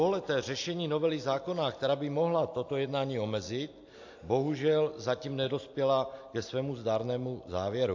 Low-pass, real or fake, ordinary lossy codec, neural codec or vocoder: 7.2 kHz; real; AAC, 48 kbps; none